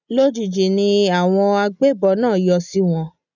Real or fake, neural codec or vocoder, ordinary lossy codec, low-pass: real; none; none; 7.2 kHz